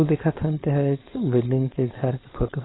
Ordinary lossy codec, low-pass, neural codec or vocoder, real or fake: AAC, 16 kbps; 7.2 kHz; codec, 16 kHz, 4.8 kbps, FACodec; fake